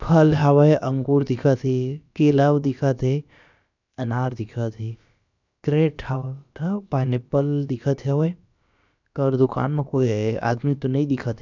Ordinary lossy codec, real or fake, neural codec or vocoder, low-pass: none; fake; codec, 16 kHz, about 1 kbps, DyCAST, with the encoder's durations; 7.2 kHz